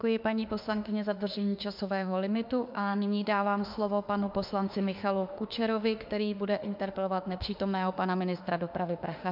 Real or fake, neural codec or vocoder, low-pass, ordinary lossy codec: fake; autoencoder, 48 kHz, 32 numbers a frame, DAC-VAE, trained on Japanese speech; 5.4 kHz; MP3, 48 kbps